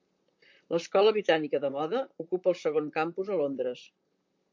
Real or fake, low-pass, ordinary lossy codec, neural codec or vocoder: fake; 7.2 kHz; MP3, 64 kbps; vocoder, 44.1 kHz, 128 mel bands, Pupu-Vocoder